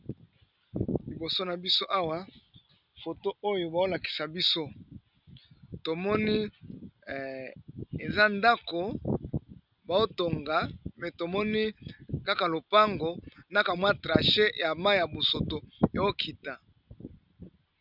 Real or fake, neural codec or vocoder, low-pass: real; none; 5.4 kHz